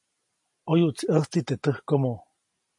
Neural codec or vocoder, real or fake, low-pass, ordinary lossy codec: none; real; 10.8 kHz; MP3, 48 kbps